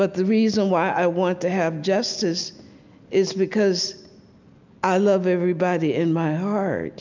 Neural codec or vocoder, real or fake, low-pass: none; real; 7.2 kHz